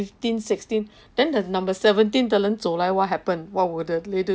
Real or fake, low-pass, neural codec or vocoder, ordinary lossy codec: real; none; none; none